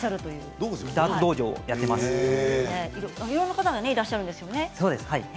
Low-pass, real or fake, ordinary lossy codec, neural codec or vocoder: none; real; none; none